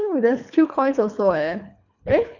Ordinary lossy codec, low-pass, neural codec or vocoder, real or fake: none; 7.2 kHz; codec, 24 kHz, 3 kbps, HILCodec; fake